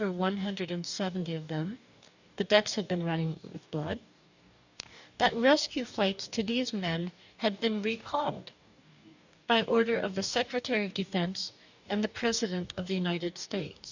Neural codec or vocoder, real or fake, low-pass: codec, 44.1 kHz, 2.6 kbps, DAC; fake; 7.2 kHz